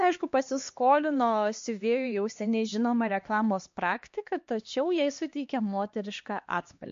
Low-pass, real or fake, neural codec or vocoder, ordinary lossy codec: 7.2 kHz; fake; codec, 16 kHz, 2 kbps, X-Codec, HuBERT features, trained on LibriSpeech; MP3, 48 kbps